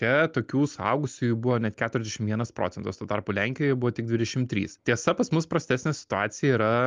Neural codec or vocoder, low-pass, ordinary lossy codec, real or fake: none; 7.2 kHz; Opus, 32 kbps; real